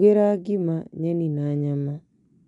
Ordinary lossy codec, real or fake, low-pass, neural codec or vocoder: none; real; 10.8 kHz; none